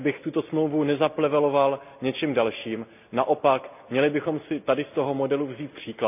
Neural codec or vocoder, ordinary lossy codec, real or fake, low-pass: none; none; real; 3.6 kHz